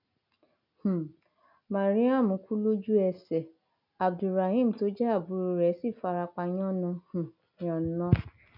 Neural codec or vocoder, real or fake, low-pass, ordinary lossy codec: none; real; 5.4 kHz; none